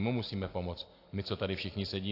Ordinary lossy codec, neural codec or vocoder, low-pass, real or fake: AAC, 32 kbps; none; 5.4 kHz; real